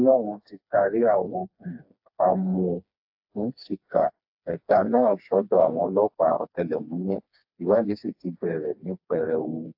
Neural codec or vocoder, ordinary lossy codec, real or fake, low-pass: codec, 16 kHz, 2 kbps, FreqCodec, smaller model; AAC, 48 kbps; fake; 5.4 kHz